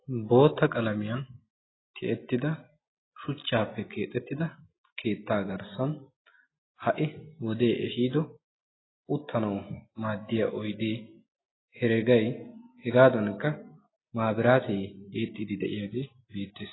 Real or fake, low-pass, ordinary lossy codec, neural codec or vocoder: real; 7.2 kHz; AAC, 16 kbps; none